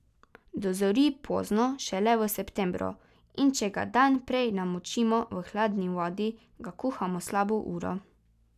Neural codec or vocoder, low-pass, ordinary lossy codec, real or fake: none; 14.4 kHz; none; real